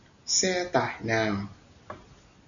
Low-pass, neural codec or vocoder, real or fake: 7.2 kHz; none; real